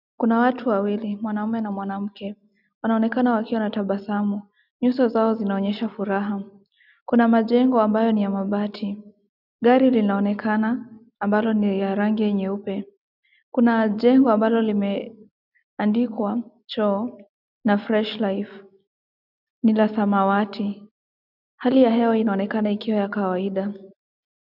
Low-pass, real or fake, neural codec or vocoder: 5.4 kHz; real; none